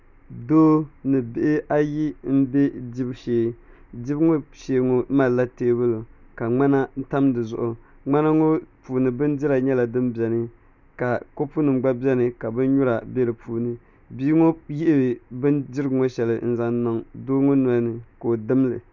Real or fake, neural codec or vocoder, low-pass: real; none; 7.2 kHz